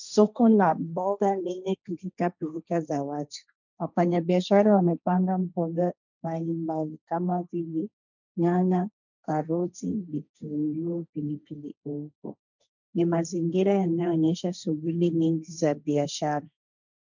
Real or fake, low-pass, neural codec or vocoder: fake; 7.2 kHz; codec, 16 kHz, 1.1 kbps, Voila-Tokenizer